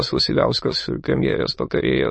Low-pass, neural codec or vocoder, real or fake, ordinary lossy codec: 9.9 kHz; autoencoder, 22.05 kHz, a latent of 192 numbers a frame, VITS, trained on many speakers; fake; MP3, 32 kbps